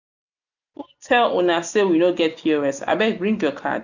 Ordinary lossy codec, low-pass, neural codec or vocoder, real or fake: none; 7.2 kHz; none; real